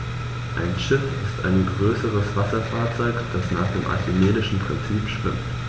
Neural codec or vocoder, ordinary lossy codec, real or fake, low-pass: none; none; real; none